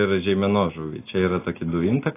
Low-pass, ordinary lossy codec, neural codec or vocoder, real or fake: 3.6 kHz; AAC, 16 kbps; none; real